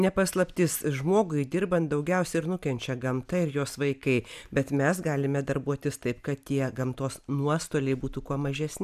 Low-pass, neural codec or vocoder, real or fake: 14.4 kHz; none; real